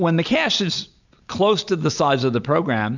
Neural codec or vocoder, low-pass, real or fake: none; 7.2 kHz; real